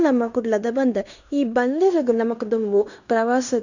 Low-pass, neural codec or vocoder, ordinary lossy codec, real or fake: 7.2 kHz; codec, 16 kHz in and 24 kHz out, 0.9 kbps, LongCat-Audio-Codec, fine tuned four codebook decoder; none; fake